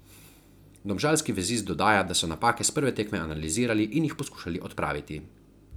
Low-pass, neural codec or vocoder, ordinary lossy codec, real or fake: none; none; none; real